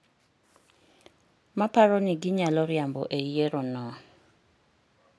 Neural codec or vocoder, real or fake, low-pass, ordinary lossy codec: none; real; none; none